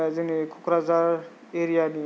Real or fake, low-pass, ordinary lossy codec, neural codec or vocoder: real; none; none; none